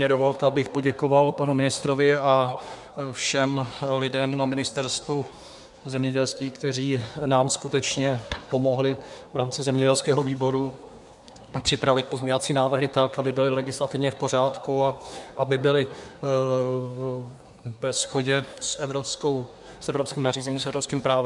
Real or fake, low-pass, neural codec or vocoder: fake; 10.8 kHz; codec, 24 kHz, 1 kbps, SNAC